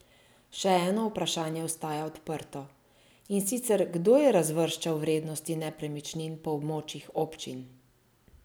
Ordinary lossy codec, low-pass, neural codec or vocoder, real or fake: none; none; none; real